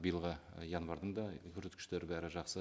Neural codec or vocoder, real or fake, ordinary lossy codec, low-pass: none; real; none; none